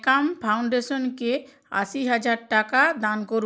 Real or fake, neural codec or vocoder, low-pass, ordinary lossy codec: real; none; none; none